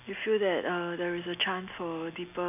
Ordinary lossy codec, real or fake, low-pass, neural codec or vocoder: none; real; 3.6 kHz; none